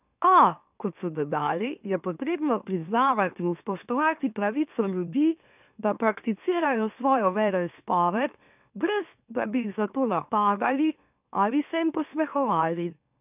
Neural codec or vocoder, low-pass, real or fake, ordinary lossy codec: autoencoder, 44.1 kHz, a latent of 192 numbers a frame, MeloTTS; 3.6 kHz; fake; none